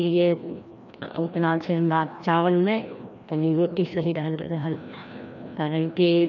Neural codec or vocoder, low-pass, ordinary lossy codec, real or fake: codec, 16 kHz, 1 kbps, FreqCodec, larger model; 7.2 kHz; none; fake